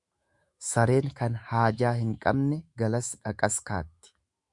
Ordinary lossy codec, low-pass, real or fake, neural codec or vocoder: Opus, 64 kbps; 10.8 kHz; fake; autoencoder, 48 kHz, 128 numbers a frame, DAC-VAE, trained on Japanese speech